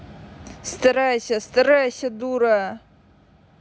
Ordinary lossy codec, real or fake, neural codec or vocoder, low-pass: none; real; none; none